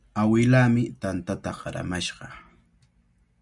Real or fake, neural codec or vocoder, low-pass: real; none; 10.8 kHz